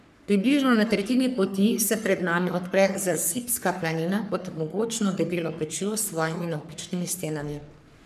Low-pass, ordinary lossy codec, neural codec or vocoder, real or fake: 14.4 kHz; none; codec, 44.1 kHz, 3.4 kbps, Pupu-Codec; fake